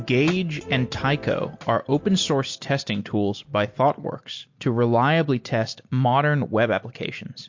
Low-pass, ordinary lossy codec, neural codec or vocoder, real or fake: 7.2 kHz; MP3, 48 kbps; none; real